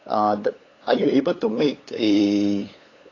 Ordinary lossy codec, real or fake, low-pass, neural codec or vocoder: AAC, 32 kbps; fake; 7.2 kHz; codec, 16 kHz, 8 kbps, FunCodec, trained on LibriTTS, 25 frames a second